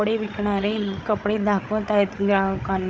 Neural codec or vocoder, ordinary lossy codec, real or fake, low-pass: codec, 16 kHz, 8 kbps, FreqCodec, larger model; none; fake; none